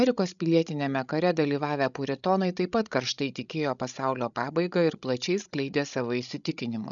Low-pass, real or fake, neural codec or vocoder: 7.2 kHz; fake; codec, 16 kHz, 16 kbps, FreqCodec, larger model